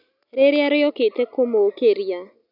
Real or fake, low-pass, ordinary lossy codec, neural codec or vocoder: real; 5.4 kHz; none; none